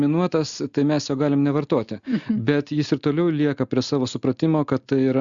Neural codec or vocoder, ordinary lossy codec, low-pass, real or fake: none; Opus, 64 kbps; 7.2 kHz; real